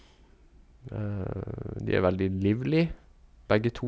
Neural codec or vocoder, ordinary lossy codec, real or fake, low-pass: none; none; real; none